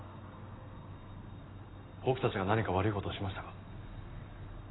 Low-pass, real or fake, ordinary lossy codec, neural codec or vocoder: 7.2 kHz; real; AAC, 16 kbps; none